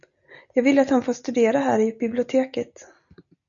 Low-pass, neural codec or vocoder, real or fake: 7.2 kHz; none; real